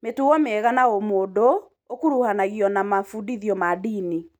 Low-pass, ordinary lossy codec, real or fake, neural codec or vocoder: 19.8 kHz; none; real; none